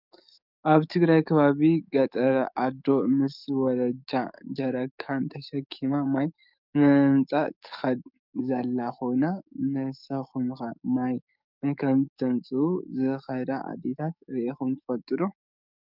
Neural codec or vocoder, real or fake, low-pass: codec, 44.1 kHz, 7.8 kbps, DAC; fake; 5.4 kHz